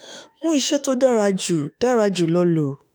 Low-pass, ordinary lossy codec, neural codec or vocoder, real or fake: none; none; autoencoder, 48 kHz, 32 numbers a frame, DAC-VAE, trained on Japanese speech; fake